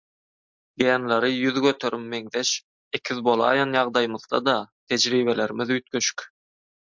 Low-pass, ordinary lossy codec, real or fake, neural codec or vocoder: 7.2 kHz; MP3, 64 kbps; real; none